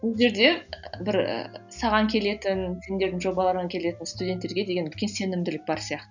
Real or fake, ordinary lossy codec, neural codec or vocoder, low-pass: real; none; none; 7.2 kHz